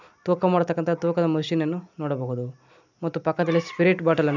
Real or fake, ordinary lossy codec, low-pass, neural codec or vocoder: real; none; 7.2 kHz; none